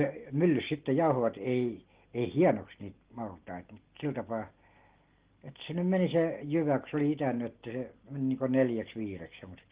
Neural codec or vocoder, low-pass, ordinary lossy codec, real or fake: none; 3.6 kHz; Opus, 16 kbps; real